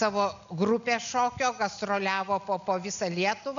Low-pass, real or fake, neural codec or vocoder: 7.2 kHz; real; none